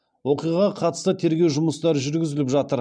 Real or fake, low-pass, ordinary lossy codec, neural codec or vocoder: real; 9.9 kHz; MP3, 96 kbps; none